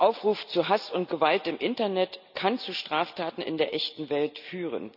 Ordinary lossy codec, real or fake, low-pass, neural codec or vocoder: none; real; 5.4 kHz; none